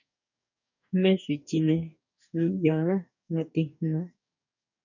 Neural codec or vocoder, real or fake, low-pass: codec, 44.1 kHz, 2.6 kbps, DAC; fake; 7.2 kHz